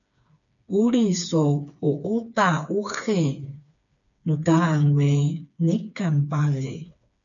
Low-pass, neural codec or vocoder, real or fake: 7.2 kHz; codec, 16 kHz, 4 kbps, FreqCodec, smaller model; fake